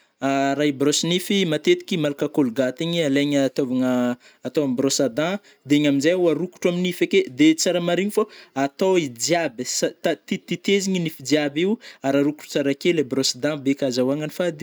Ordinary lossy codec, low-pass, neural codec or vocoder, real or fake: none; none; none; real